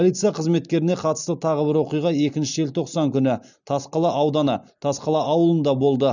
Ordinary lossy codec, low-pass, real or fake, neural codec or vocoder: none; 7.2 kHz; real; none